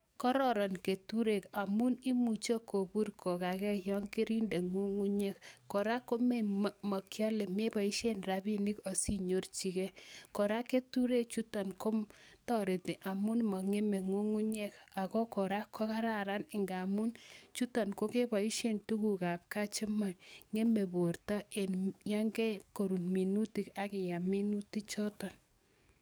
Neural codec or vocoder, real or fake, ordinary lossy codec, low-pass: codec, 44.1 kHz, 7.8 kbps, DAC; fake; none; none